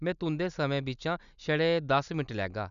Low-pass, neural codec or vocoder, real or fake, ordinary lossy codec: 7.2 kHz; none; real; none